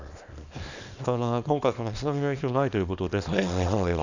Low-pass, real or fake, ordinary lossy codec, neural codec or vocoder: 7.2 kHz; fake; none; codec, 24 kHz, 0.9 kbps, WavTokenizer, small release